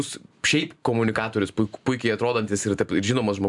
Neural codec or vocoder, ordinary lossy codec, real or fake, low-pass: vocoder, 44.1 kHz, 128 mel bands every 512 samples, BigVGAN v2; MP3, 96 kbps; fake; 10.8 kHz